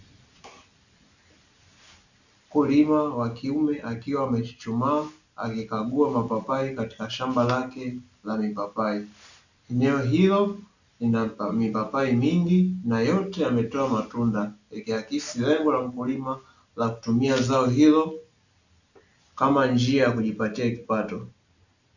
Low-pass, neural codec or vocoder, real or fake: 7.2 kHz; none; real